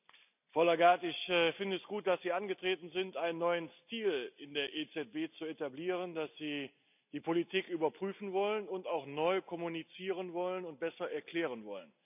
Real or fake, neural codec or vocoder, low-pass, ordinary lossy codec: real; none; 3.6 kHz; none